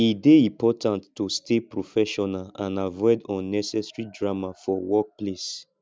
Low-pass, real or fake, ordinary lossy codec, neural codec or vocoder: none; real; none; none